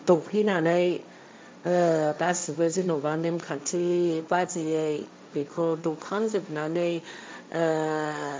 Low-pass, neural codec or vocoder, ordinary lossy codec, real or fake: none; codec, 16 kHz, 1.1 kbps, Voila-Tokenizer; none; fake